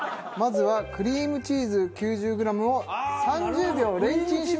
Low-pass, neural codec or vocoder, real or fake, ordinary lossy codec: none; none; real; none